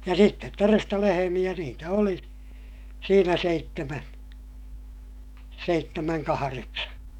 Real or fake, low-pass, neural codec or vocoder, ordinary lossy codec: fake; 19.8 kHz; autoencoder, 48 kHz, 128 numbers a frame, DAC-VAE, trained on Japanese speech; none